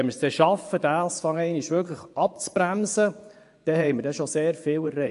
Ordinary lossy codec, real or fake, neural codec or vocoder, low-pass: AAC, 64 kbps; fake; vocoder, 24 kHz, 100 mel bands, Vocos; 10.8 kHz